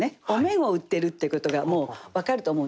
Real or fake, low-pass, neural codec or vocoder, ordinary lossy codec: real; none; none; none